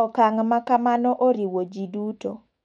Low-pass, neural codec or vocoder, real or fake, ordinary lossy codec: 19.8 kHz; autoencoder, 48 kHz, 128 numbers a frame, DAC-VAE, trained on Japanese speech; fake; MP3, 48 kbps